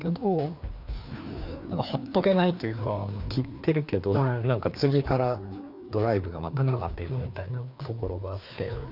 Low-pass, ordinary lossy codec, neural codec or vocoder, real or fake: 5.4 kHz; none; codec, 16 kHz, 2 kbps, FreqCodec, larger model; fake